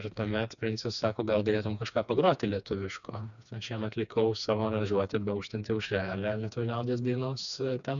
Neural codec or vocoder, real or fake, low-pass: codec, 16 kHz, 2 kbps, FreqCodec, smaller model; fake; 7.2 kHz